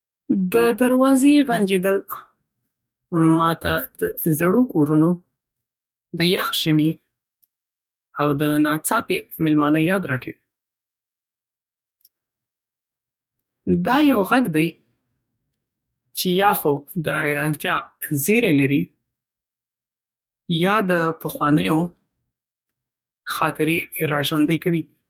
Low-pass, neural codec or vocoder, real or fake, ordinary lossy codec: 19.8 kHz; codec, 44.1 kHz, 2.6 kbps, DAC; fake; none